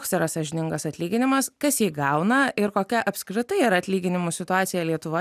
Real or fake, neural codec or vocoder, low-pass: real; none; 14.4 kHz